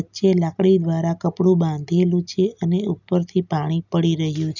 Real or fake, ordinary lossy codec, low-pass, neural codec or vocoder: real; none; 7.2 kHz; none